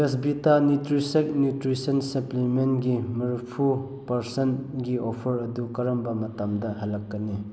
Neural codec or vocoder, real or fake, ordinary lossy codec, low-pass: none; real; none; none